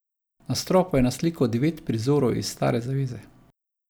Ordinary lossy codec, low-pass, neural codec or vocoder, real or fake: none; none; none; real